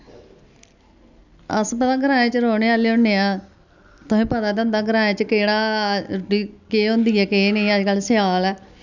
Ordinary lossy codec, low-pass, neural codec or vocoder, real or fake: none; 7.2 kHz; none; real